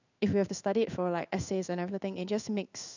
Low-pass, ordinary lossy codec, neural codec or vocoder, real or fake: 7.2 kHz; none; codec, 16 kHz in and 24 kHz out, 1 kbps, XY-Tokenizer; fake